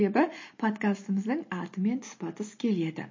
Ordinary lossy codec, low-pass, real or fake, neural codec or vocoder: MP3, 32 kbps; 7.2 kHz; real; none